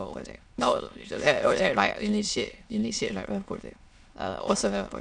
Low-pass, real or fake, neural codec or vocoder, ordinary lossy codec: 9.9 kHz; fake; autoencoder, 22.05 kHz, a latent of 192 numbers a frame, VITS, trained on many speakers; none